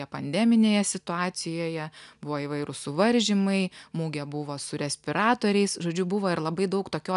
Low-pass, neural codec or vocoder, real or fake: 10.8 kHz; none; real